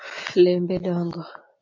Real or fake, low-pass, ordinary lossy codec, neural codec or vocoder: real; 7.2 kHz; MP3, 48 kbps; none